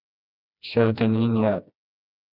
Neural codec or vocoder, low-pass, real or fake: codec, 16 kHz, 1 kbps, FreqCodec, smaller model; 5.4 kHz; fake